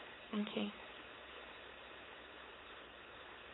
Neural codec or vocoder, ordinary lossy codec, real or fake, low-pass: codec, 16 kHz, 4.8 kbps, FACodec; AAC, 16 kbps; fake; 7.2 kHz